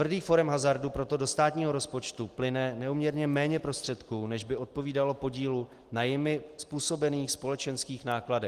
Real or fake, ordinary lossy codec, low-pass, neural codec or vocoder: real; Opus, 24 kbps; 14.4 kHz; none